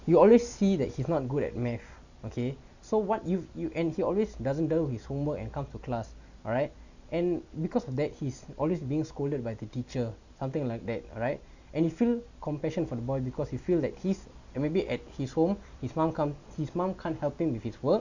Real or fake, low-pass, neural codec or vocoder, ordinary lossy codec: real; 7.2 kHz; none; none